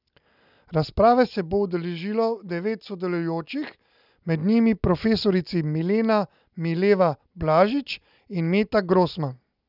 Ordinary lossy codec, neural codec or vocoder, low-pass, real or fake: none; none; 5.4 kHz; real